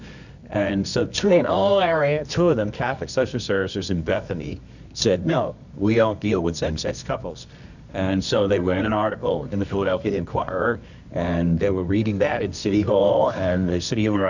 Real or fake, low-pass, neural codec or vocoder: fake; 7.2 kHz; codec, 24 kHz, 0.9 kbps, WavTokenizer, medium music audio release